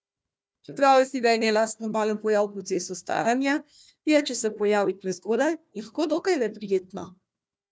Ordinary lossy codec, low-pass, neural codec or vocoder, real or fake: none; none; codec, 16 kHz, 1 kbps, FunCodec, trained on Chinese and English, 50 frames a second; fake